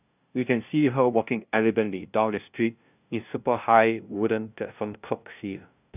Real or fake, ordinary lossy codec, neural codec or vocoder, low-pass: fake; none; codec, 16 kHz, 0.5 kbps, FunCodec, trained on LibriTTS, 25 frames a second; 3.6 kHz